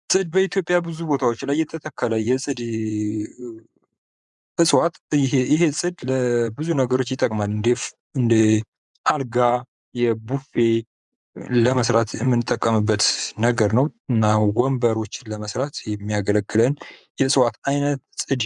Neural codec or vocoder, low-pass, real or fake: vocoder, 24 kHz, 100 mel bands, Vocos; 10.8 kHz; fake